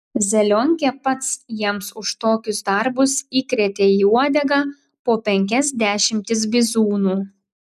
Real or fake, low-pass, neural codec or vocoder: real; 14.4 kHz; none